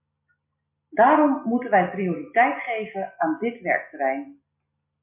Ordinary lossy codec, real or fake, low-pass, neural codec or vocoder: MP3, 24 kbps; real; 3.6 kHz; none